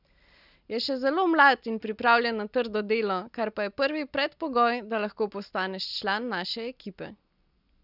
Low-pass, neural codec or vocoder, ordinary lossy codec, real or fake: 5.4 kHz; none; none; real